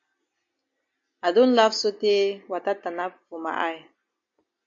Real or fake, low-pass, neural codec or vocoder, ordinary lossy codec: real; 7.2 kHz; none; MP3, 48 kbps